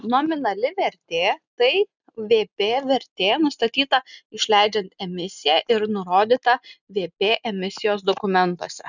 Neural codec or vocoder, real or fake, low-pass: none; real; 7.2 kHz